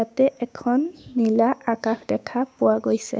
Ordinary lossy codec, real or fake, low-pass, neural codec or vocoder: none; fake; none; codec, 16 kHz, 6 kbps, DAC